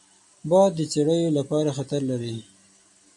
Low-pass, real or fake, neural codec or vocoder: 10.8 kHz; real; none